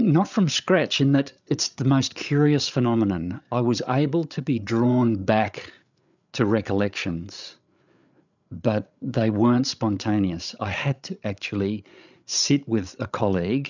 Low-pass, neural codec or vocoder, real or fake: 7.2 kHz; codec, 16 kHz, 8 kbps, FreqCodec, larger model; fake